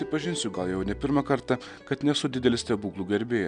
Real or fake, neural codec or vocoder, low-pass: real; none; 10.8 kHz